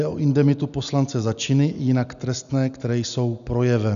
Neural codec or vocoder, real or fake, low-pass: none; real; 7.2 kHz